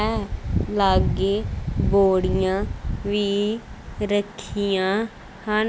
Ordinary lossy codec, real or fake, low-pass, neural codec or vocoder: none; real; none; none